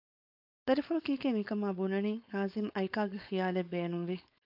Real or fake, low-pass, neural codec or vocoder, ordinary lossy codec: fake; 5.4 kHz; codec, 16 kHz, 4.8 kbps, FACodec; AAC, 48 kbps